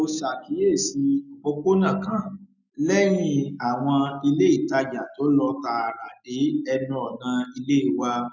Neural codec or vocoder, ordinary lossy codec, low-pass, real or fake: none; none; 7.2 kHz; real